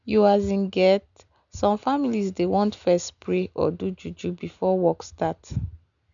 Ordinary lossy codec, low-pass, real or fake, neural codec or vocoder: none; 7.2 kHz; real; none